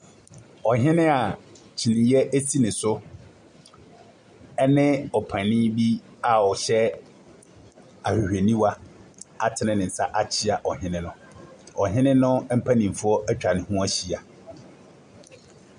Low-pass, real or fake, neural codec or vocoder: 9.9 kHz; real; none